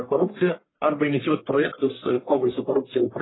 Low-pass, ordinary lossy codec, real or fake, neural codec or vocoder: 7.2 kHz; AAC, 16 kbps; fake; codec, 44.1 kHz, 1.7 kbps, Pupu-Codec